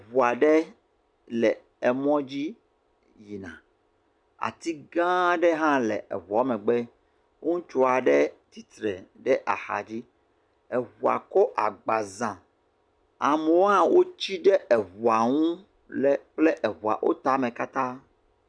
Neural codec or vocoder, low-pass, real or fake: none; 9.9 kHz; real